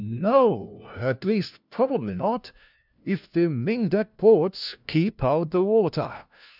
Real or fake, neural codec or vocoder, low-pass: fake; codec, 16 kHz, 1 kbps, FunCodec, trained on LibriTTS, 50 frames a second; 5.4 kHz